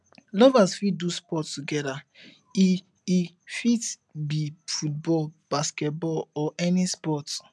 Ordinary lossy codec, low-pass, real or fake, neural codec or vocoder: none; none; real; none